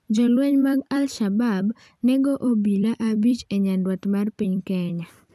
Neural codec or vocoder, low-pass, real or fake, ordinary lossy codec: vocoder, 44.1 kHz, 128 mel bands every 256 samples, BigVGAN v2; 14.4 kHz; fake; none